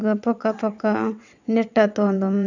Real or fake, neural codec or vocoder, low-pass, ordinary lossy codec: fake; vocoder, 22.05 kHz, 80 mel bands, WaveNeXt; 7.2 kHz; none